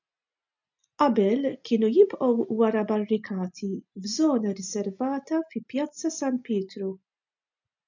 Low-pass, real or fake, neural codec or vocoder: 7.2 kHz; real; none